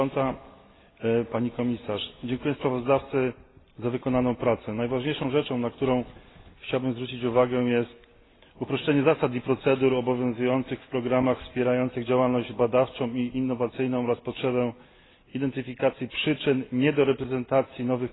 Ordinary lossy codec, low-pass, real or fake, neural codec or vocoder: AAC, 16 kbps; 7.2 kHz; real; none